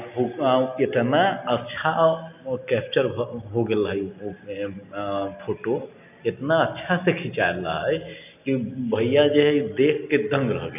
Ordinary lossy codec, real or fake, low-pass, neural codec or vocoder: none; real; 3.6 kHz; none